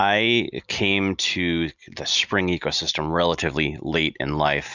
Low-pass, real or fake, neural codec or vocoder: 7.2 kHz; real; none